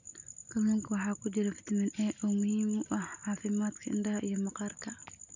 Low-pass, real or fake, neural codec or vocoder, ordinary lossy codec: 7.2 kHz; real; none; none